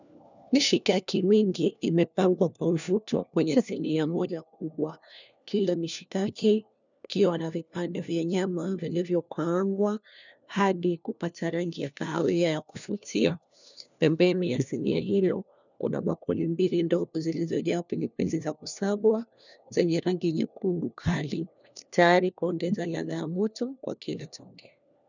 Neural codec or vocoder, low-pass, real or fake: codec, 16 kHz, 1 kbps, FunCodec, trained on LibriTTS, 50 frames a second; 7.2 kHz; fake